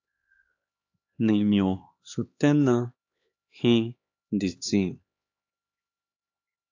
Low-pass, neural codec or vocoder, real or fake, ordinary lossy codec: 7.2 kHz; codec, 16 kHz, 2 kbps, X-Codec, HuBERT features, trained on LibriSpeech; fake; AAC, 48 kbps